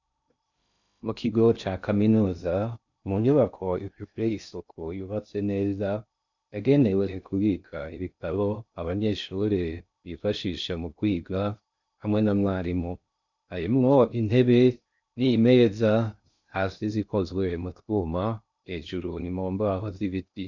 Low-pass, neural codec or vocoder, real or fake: 7.2 kHz; codec, 16 kHz in and 24 kHz out, 0.6 kbps, FocalCodec, streaming, 2048 codes; fake